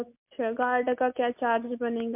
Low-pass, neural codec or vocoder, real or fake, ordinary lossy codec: 3.6 kHz; none; real; MP3, 32 kbps